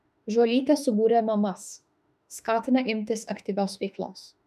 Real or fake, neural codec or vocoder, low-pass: fake; autoencoder, 48 kHz, 32 numbers a frame, DAC-VAE, trained on Japanese speech; 14.4 kHz